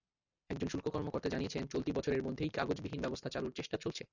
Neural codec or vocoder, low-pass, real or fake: none; 7.2 kHz; real